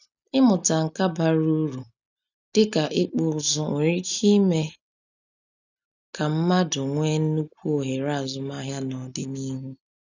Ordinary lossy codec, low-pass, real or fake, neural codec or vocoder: none; 7.2 kHz; real; none